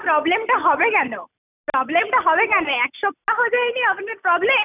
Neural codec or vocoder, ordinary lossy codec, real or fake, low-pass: none; none; real; 3.6 kHz